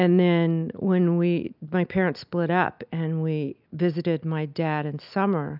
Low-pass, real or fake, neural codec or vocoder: 5.4 kHz; real; none